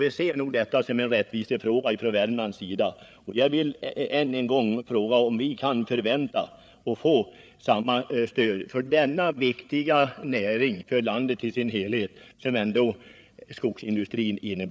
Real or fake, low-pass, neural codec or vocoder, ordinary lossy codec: fake; none; codec, 16 kHz, 8 kbps, FreqCodec, larger model; none